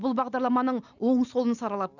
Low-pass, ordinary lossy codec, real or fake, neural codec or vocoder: 7.2 kHz; none; real; none